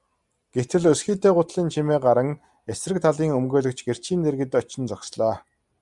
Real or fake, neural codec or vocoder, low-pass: real; none; 10.8 kHz